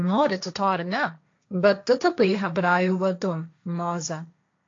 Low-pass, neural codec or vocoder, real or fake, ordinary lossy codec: 7.2 kHz; codec, 16 kHz, 1.1 kbps, Voila-Tokenizer; fake; AAC, 48 kbps